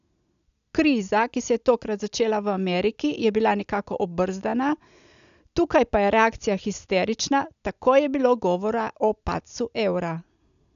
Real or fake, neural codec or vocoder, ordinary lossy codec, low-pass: real; none; none; 7.2 kHz